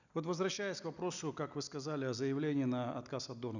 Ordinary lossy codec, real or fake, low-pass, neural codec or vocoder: none; real; 7.2 kHz; none